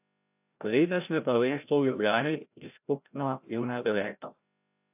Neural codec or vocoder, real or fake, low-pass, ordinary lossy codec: codec, 16 kHz, 0.5 kbps, FreqCodec, larger model; fake; 3.6 kHz; AAC, 32 kbps